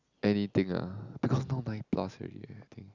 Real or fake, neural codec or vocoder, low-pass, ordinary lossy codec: real; none; 7.2 kHz; Opus, 64 kbps